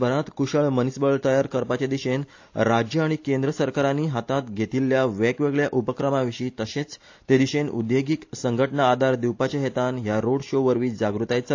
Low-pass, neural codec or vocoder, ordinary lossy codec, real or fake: 7.2 kHz; none; AAC, 48 kbps; real